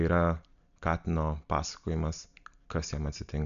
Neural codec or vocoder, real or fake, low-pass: none; real; 7.2 kHz